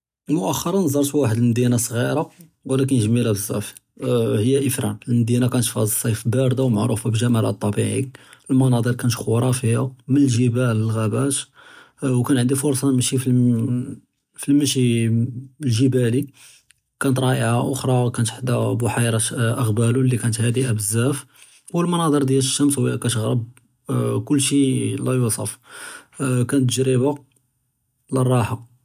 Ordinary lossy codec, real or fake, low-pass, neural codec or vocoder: none; real; 14.4 kHz; none